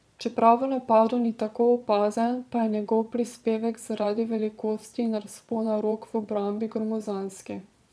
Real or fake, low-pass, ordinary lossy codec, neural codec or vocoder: fake; none; none; vocoder, 22.05 kHz, 80 mel bands, Vocos